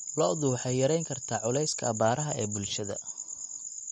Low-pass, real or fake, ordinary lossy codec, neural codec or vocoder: 19.8 kHz; real; MP3, 48 kbps; none